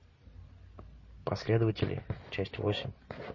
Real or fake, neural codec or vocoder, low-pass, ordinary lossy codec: real; none; 7.2 kHz; MP3, 32 kbps